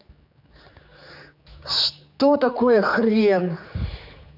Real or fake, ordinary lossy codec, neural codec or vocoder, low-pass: fake; none; codec, 16 kHz, 4 kbps, X-Codec, HuBERT features, trained on general audio; 5.4 kHz